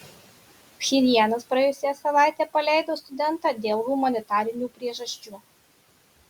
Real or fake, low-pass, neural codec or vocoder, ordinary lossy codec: real; 19.8 kHz; none; Opus, 64 kbps